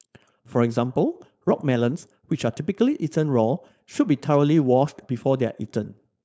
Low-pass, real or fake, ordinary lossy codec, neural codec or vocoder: none; fake; none; codec, 16 kHz, 4.8 kbps, FACodec